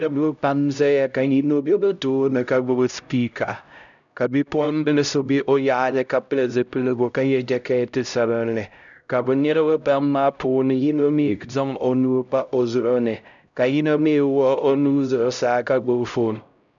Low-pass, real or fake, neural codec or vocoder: 7.2 kHz; fake; codec, 16 kHz, 0.5 kbps, X-Codec, HuBERT features, trained on LibriSpeech